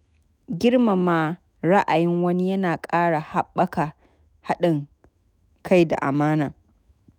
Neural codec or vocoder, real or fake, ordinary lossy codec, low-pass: autoencoder, 48 kHz, 128 numbers a frame, DAC-VAE, trained on Japanese speech; fake; none; 19.8 kHz